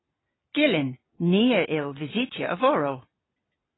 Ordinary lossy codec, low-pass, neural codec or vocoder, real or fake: AAC, 16 kbps; 7.2 kHz; none; real